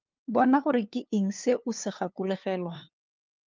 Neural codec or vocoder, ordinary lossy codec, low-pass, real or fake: codec, 16 kHz, 2 kbps, FunCodec, trained on LibriTTS, 25 frames a second; Opus, 24 kbps; 7.2 kHz; fake